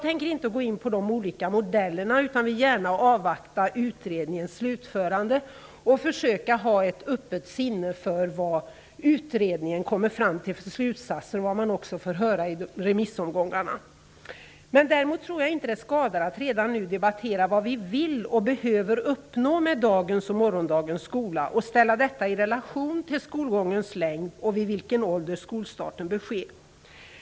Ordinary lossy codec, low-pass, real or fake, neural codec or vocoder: none; none; real; none